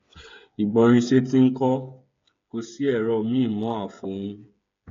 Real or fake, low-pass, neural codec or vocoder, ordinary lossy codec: fake; 7.2 kHz; codec, 16 kHz, 8 kbps, FreqCodec, smaller model; AAC, 48 kbps